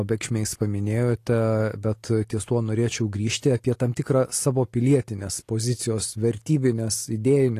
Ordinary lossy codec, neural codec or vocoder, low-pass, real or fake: AAC, 48 kbps; none; 14.4 kHz; real